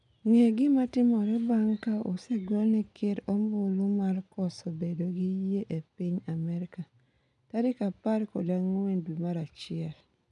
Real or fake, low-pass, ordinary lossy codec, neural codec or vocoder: real; 10.8 kHz; none; none